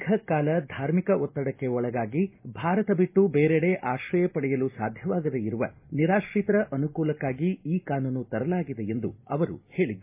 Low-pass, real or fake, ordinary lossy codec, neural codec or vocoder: 3.6 kHz; real; MP3, 24 kbps; none